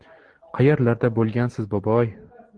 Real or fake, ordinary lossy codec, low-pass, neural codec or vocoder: real; Opus, 16 kbps; 9.9 kHz; none